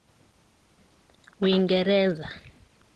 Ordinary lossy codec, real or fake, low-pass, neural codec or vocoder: Opus, 16 kbps; real; 10.8 kHz; none